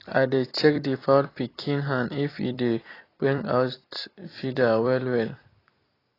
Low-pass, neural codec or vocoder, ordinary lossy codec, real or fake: 5.4 kHz; vocoder, 44.1 kHz, 128 mel bands every 256 samples, BigVGAN v2; AAC, 24 kbps; fake